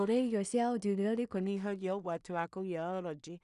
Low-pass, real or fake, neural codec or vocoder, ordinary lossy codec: 10.8 kHz; fake; codec, 16 kHz in and 24 kHz out, 0.4 kbps, LongCat-Audio-Codec, two codebook decoder; none